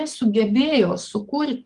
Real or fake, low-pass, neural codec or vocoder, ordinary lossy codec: fake; 10.8 kHz; codec, 44.1 kHz, 7.8 kbps, DAC; Opus, 32 kbps